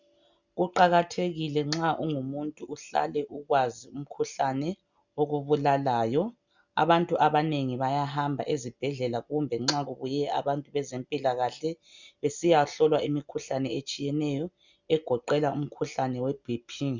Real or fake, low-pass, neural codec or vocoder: real; 7.2 kHz; none